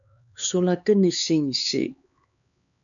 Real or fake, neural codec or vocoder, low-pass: fake; codec, 16 kHz, 4 kbps, X-Codec, HuBERT features, trained on LibriSpeech; 7.2 kHz